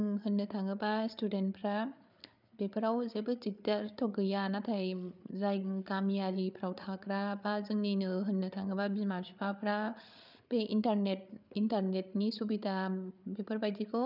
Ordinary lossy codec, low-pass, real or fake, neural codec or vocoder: none; 5.4 kHz; fake; codec, 16 kHz, 8 kbps, FreqCodec, larger model